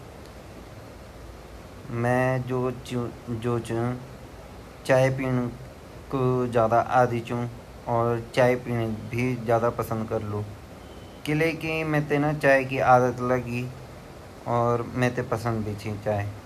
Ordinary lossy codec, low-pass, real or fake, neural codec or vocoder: none; 14.4 kHz; real; none